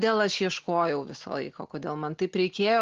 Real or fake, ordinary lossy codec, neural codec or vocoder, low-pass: real; Opus, 16 kbps; none; 7.2 kHz